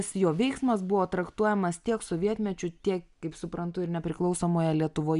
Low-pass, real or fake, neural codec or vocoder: 10.8 kHz; real; none